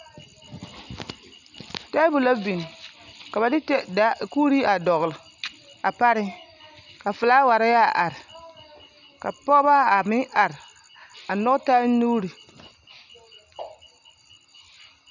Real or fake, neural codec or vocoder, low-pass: real; none; 7.2 kHz